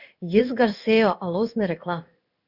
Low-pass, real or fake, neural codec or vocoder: 5.4 kHz; fake; codec, 16 kHz in and 24 kHz out, 1 kbps, XY-Tokenizer